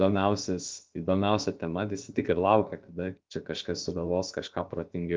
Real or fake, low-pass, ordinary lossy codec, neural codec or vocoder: fake; 7.2 kHz; Opus, 32 kbps; codec, 16 kHz, about 1 kbps, DyCAST, with the encoder's durations